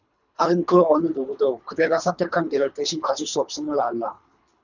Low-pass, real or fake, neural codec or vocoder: 7.2 kHz; fake; codec, 24 kHz, 3 kbps, HILCodec